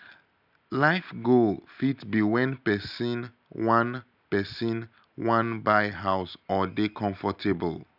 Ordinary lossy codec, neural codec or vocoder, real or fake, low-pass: none; none; real; 5.4 kHz